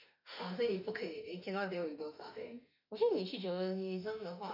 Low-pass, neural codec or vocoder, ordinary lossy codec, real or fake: 5.4 kHz; autoencoder, 48 kHz, 32 numbers a frame, DAC-VAE, trained on Japanese speech; none; fake